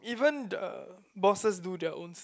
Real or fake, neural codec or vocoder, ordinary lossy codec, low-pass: real; none; none; none